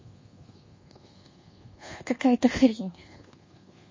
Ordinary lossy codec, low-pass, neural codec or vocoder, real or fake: MP3, 32 kbps; 7.2 kHz; codec, 24 kHz, 1.2 kbps, DualCodec; fake